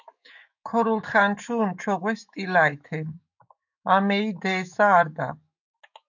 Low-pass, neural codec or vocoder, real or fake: 7.2 kHz; autoencoder, 48 kHz, 128 numbers a frame, DAC-VAE, trained on Japanese speech; fake